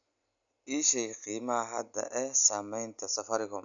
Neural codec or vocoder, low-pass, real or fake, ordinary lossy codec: none; 7.2 kHz; real; none